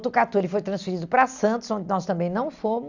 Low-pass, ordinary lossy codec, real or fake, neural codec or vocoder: 7.2 kHz; none; real; none